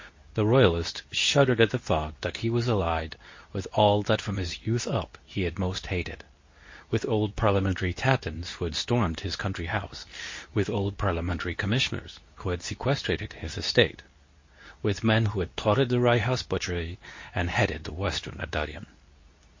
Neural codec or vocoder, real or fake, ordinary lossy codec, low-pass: codec, 24 kHz, 0.9 kbps, WavTokenizer, medium speech release version 2; fake; MP3, 32 kbps; 7.2 kHz